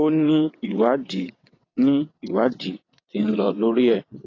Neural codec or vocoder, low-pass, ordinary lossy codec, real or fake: vocoder, 22.05 kHz, 80 mel bands, WaveNeXt; 7.2 kHz; AAC, 32 kbps; fake